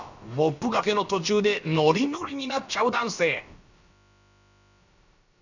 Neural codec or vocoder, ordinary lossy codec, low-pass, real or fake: codec, 16 kHz, about 1 kbps, DyCAST, with the encoder's durations; none; 7.2 kHz; fake